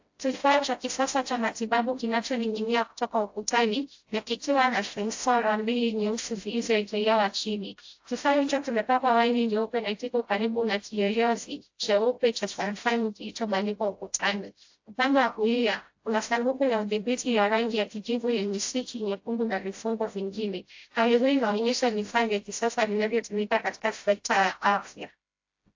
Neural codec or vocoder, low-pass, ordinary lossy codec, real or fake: codec, 16 kHz, 0.5 kbps, FreqCodec, smaller model; 7.2 kHz; AAC, 48 kbps; fake